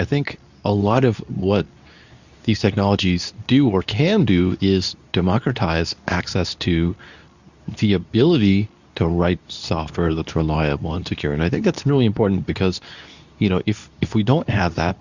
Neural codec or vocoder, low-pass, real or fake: codec, 24 kHz, 0.9 kbps, WavTokenizer, medium speech release version 2; 7.2 kHz; fake